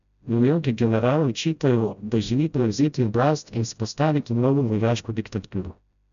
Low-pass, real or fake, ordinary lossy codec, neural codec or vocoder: 7.2 kHz; fake; none; codec, 16 kHz, 0.5 kbps, FreqCodec, smaller model